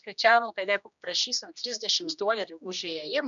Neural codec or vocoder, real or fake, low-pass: codec, 16 kHz, 1 kbps, X-Codec, HuBERT features, trained on general audio; fake; 7.2 kHz